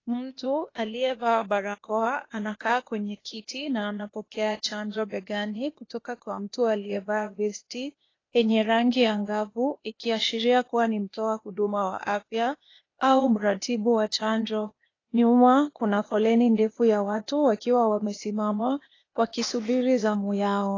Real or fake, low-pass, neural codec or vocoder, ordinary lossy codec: fake; 7.2 kHz; codec, 16 kHz, 0.8 kbps, ZipCodec; AAC, 32 kbps